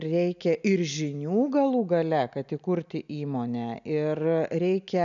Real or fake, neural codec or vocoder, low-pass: real; none; 7.2 kHz